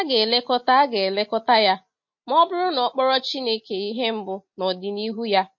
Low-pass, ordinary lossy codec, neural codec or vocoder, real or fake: 7.2 kHz; MP3, 32 kbps; none; real